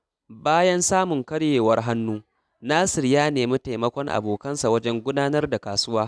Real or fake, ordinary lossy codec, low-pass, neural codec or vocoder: real; none; none; none